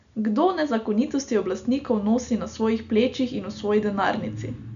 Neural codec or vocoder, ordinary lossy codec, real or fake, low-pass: none; none; real; 7.2 kHz